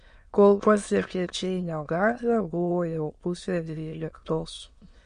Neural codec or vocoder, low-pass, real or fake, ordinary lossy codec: autoencoder, 22.05 kHz, a latent of 192 numbers a frame, VITS, trained on many speakers; 9.9 kHz; fake; MP3, 48 kbps